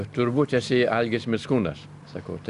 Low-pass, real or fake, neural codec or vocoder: 10.8 kHz; real; none